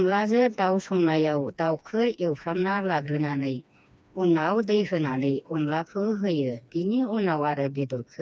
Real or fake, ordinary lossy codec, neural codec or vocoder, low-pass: fake; none; codec, 16 kHz, 2 kbps, FreqCodec, smaller model; none